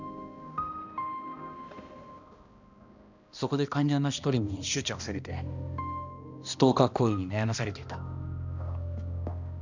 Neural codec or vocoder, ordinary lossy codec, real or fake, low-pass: codec, 16 kHz, 1 kbps, X-Codec, HuBERT features, trained on balanced general audio; none; fake; 7.2 kHz